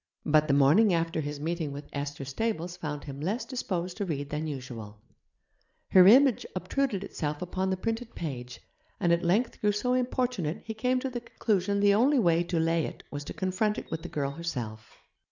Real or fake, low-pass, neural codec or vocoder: real; 7.2 kHz; none